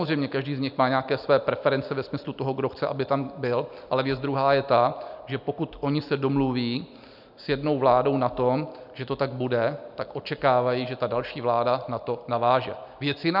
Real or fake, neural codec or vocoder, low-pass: real; none; 5.4 kHz